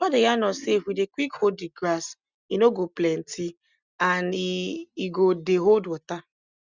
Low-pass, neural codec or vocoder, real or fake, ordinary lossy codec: 7.2 kHz; none; real; Opus, 64 kbps